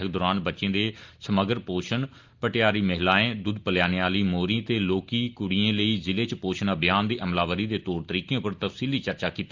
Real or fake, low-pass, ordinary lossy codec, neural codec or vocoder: real; 7.2 kHz; Opus, 32 kbps; none